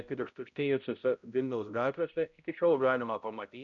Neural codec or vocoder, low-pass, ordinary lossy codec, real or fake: codec, 16 kHz, 0.5 kbps, X-Codec, HuBERT features, trained on balanced general audio; 7.2 kHz; MP3, 96 kbps; fake